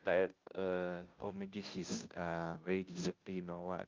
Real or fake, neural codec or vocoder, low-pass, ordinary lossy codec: fake; codec, 16 kHz, 0.5 kbps, FunCodec, trained on Chinese and English, 25 frames a second; 7.2 kHz; Opus, 24 kbps